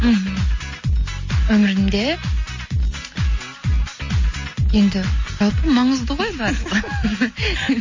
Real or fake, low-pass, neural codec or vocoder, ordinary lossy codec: real; 7.2 kHz; none; MP3, 32 kbps